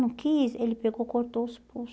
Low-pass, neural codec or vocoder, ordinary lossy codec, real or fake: none; none; none; real